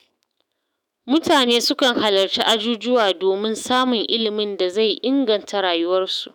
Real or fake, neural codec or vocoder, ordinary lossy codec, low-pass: fake; autoencoder, 48 kHz, 128 numbers a frame, DAC-VAE, trained on Japanese speech; none; none